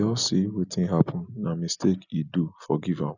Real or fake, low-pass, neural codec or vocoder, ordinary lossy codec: real; 7.2 kHz; none; none